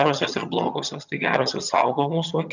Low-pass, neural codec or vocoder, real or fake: 7.2 kHz; vocoder, 22.05 kHz, 80 mel bands, HiFi-GAN; fake